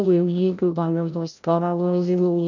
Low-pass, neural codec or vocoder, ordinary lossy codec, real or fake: 7.2 kHz; codec, 16 kHz, 0.5 kbps, FreqCodec, larger model; none; fake